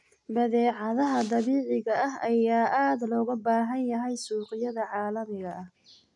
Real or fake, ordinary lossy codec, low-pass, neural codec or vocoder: real; none; 10.8 kHz; none